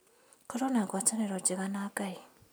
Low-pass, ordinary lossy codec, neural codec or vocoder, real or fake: none; none; none; real